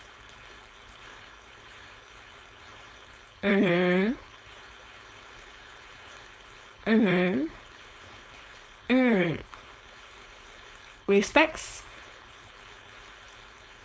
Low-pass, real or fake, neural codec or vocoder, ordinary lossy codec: none; fake; codec, 16 kHz, 4.8 kbps, FACodec; none